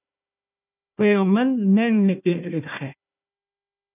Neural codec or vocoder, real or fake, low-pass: codec, 16 kHz, 1 kbps, FunCodec, trained on Chinese and English, 50 frames a second; fake; 3.6 kHz